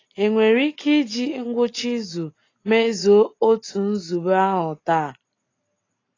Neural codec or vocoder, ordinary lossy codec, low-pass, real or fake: none; AAC, 32 kbps; 7.2 kHz; real